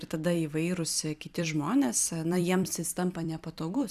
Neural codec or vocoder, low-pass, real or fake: vocoder, 44.1 kHz, 128 mel bands every 256 samples, BigVGAN v2; 14.4 kHz; fake